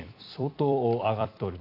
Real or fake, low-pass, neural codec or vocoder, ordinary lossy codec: fake; 5.4 kHz; vocoder, 44.1 kHz, 128 mel bands every 256 samples, BigVGAN v2; AAC, 32 kbps